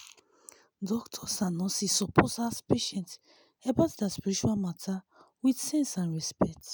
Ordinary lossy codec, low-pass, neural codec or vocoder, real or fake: none; none; none; real